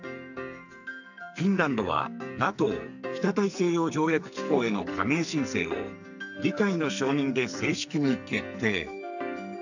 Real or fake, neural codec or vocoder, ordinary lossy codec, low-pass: fake; codec, 44.1 kHz, 2.6 kbps, SNAC; none; 7.2 kHz